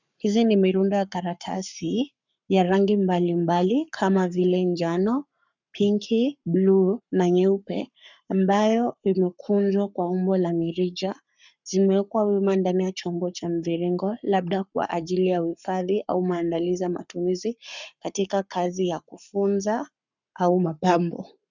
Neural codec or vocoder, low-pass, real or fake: codec, 44.1 kHz, 7.8 kbps, Pupu-Codec; 7.2 kHz; fake